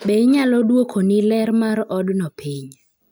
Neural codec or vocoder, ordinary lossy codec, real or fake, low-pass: none; none; real; none